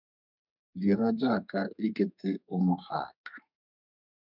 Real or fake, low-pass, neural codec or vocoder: fake; 5.4 kHz; codec, 32 kHz, 1.9 kbps, SNAC